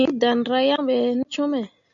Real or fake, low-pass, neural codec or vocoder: real; 7.2 kHz; none